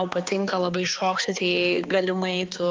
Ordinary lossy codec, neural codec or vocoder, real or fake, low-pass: Opus, 32 kbps; codec, 16 kHz, 2 kbps, X-Codec, HuBERT features, trained on balanced general audio; fake; 7.2 kHz